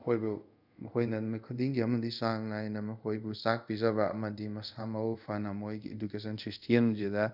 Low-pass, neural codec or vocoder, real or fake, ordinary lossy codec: 5.4 kHz; codec, 24 kHz, 0.5 kbps, DualCodec; fake; none